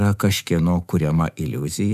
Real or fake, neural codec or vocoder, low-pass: fake; autoencoder, 48 kHz, 128 numbers a frame, DAC-VAE, trained on Japanese speech; 14.4 kHz